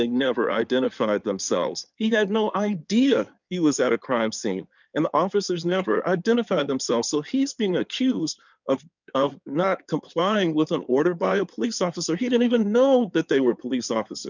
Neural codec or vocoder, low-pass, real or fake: codec, 16 kHz in and 24 kHz out, 2.2 kbps, FireRedTTS-2 codec; 7.2 kHz; fake